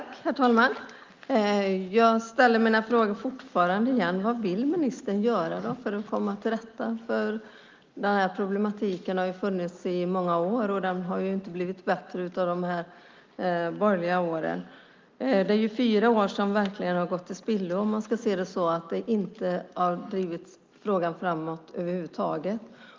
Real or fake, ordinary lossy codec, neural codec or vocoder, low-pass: real; Opus, 24 kbps; none; 7.2 kHz